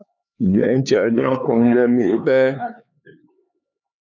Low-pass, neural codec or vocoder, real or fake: 7.2 kHz; codec, 16 kHz, 4 kbps, X-Codec, HuBERT features, trained on LibriSpeech; fake